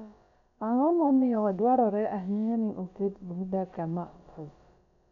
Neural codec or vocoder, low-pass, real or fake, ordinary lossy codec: codec, 16 kHz, about 1 kbps, DyCAST, with the encoder's durations; 7.2 kHz; fake; MP3, 96 kbps